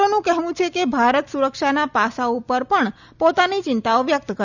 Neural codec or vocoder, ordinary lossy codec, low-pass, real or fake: none; none; 7.2 kHz; real